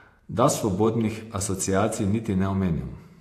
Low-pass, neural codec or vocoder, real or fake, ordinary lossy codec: 14.4 kHz; vocoder, 48 kHz, 128 mel bands, Vocos; fake; AAC, 64 kbps